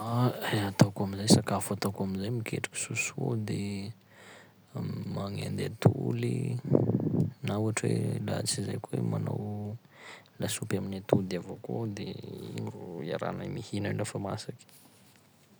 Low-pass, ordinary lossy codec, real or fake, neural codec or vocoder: none; none; real; none